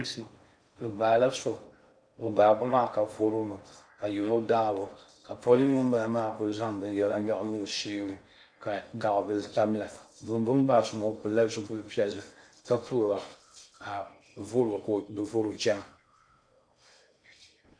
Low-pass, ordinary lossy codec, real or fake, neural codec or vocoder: 9.9 kHz; AAC, 64 kbps; fake; codec, 16 kHz in and 24 kHz out, 0.6 kbps, FocalCodec, streaming, 4096 codes